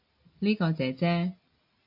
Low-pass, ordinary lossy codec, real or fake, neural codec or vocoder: 5.4 kHz; AAC, 48 kbps; real; none